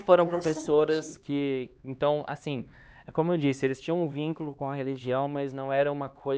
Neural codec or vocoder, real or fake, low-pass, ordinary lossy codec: codec, 16 kHz, 2 kbps, X-Codec, HuBERT features, trained on LibriSpeech; fake; none; none